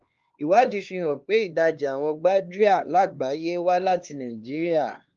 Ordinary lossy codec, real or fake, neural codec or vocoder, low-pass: Opus, 32 kbps; fake; codec, 16 kHz, 2 kbps, X-Codec, HuBERT features, trained on LibriSpeech; 7.2 kHz